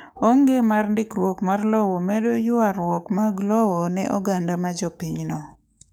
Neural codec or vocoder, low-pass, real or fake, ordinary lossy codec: codec, 44.1 kHz, 7.8 kbps, DAC; none; fake; none